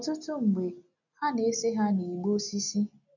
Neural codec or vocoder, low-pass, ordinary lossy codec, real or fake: none; 7.2 kHz; none; real